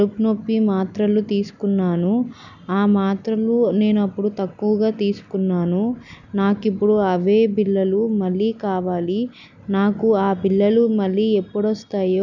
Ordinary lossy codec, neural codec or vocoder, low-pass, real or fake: none; none; 7.2 kHz; real